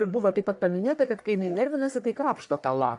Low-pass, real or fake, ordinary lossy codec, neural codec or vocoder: 10.8 kHz; fake; AAC, 64 kbps; codec, 44.1 kHz, 1.7 kbps, Pupu-Codec